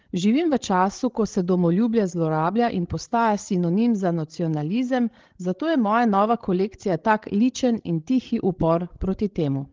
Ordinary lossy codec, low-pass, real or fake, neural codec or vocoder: Opus, 16 kbps; 7.2 kHz; fake; codec, 16 kHz, 16 kbps, FreqCodec, larger model